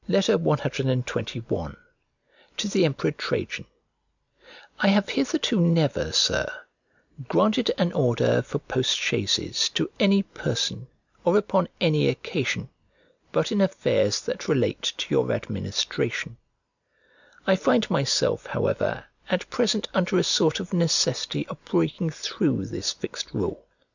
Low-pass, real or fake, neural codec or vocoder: 7.2 kHz; real; none